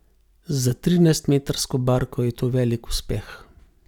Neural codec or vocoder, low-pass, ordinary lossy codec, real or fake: none; 19.8 kHz; none; real